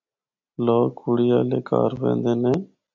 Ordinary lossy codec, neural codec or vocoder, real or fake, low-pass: MP3, 48 kbps; none; real; 7.2 kHz